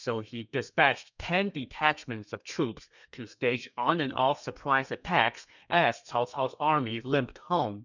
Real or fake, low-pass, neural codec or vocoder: fake; 7.2 kHz; codec, 32 kHz, 1.9 kbps, SNAC